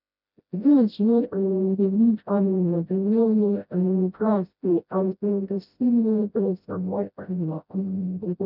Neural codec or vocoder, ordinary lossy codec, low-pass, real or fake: codec, 16 kHz, 0.5 kbps, FreqCodec, smaller model; none; 5.4 kHz; fake